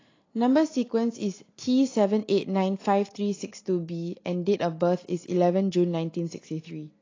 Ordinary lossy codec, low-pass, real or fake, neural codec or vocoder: AAC, 32 kbps; 7.2 kHz; real; none